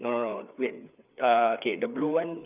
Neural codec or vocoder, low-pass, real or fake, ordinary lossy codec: codec, 16 kHz, 4 kbps, FreqCodec, larger model; 3.6 kHz; fake; none